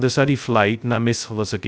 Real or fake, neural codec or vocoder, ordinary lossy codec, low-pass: fake; codec, 16 kHz, 0.2 kbps, FocalCodec; none; none